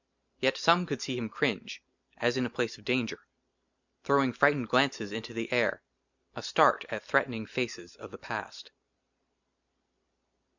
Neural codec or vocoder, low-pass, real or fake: none; 7.2 kHz; real